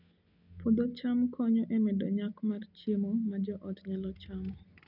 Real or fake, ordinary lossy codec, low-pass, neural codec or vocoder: real; none; 5.4 kHz; none